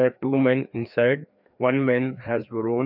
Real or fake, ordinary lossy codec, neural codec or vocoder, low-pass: fake; none; codec, 16 kHz, 2 kbps, FreqCodec, larger model; 5.4 kHz